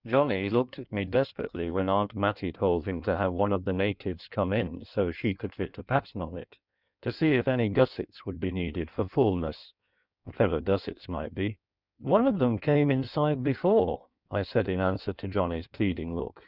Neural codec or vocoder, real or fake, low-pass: codec, 16 kHz in and 24 kHz out, 1.1 kbps, FireRedTTS-2 codec; fake; 5.4 kHz